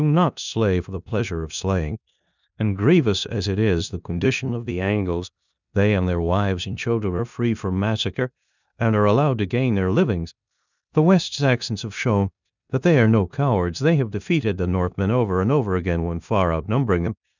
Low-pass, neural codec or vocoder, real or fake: 7.2 kHz; codec, 16 kHz in and 24 kHz out, 0.9 kbps, LongCat-Audio-Codec, four codebook decoder; fake